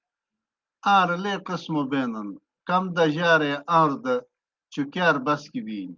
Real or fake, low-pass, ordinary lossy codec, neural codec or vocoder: real; 7.2 kHz; Opus, 32 kbps; none